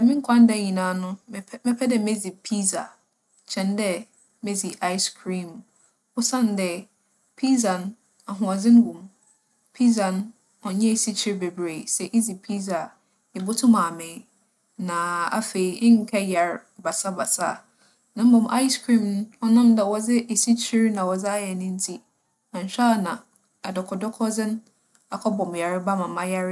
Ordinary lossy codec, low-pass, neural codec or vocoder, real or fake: none; none; none; real